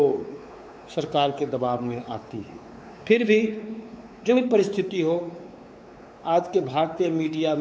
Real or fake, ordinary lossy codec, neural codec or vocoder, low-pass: fake; none; codec, 16 kHz, 4 kbps, X-Codec, WavLM features, trained on Multilingual LibriSpeech; none